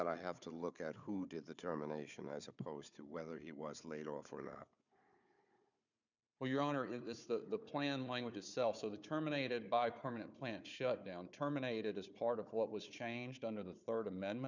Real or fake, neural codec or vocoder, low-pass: fake; codec, 16 kHz, 4 kbps, FunCodec, trained on Chinese and English, 50 frames a second; 7.2 kHz